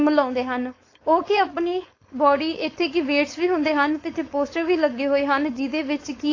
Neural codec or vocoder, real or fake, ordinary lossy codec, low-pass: codec, 16 kHz, 4.8 kbps, FACodec; fake; AAC, 32 kbps; 7.2 kHz